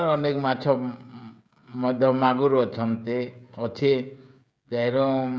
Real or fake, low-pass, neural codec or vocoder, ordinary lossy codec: fake; none; codec, 16 kHz, 16 kbps, FreqCodec, smaller model; none